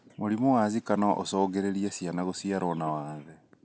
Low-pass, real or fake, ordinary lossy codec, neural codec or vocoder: none; real; none; none